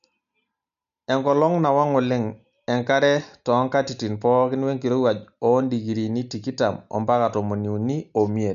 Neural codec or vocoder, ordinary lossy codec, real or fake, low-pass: none; none; real; 7.2 kHz